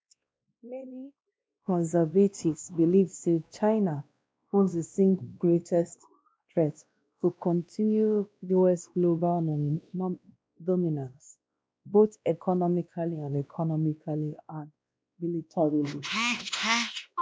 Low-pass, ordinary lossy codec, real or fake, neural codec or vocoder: none; none; fake; codec, 16 kHz, 1 kbps, X-Codec, WavLM features, trained on Multilingual LibriSpeech